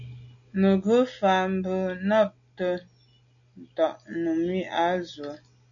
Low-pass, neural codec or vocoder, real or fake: 7.2 kHz; none; real